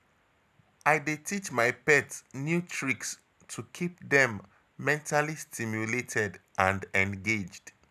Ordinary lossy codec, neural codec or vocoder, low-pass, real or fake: none; none; 14.4 kHz; real